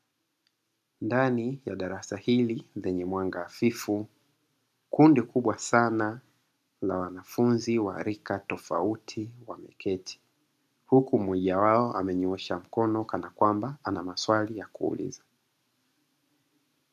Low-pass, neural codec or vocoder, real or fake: 14.4 kHz; none; real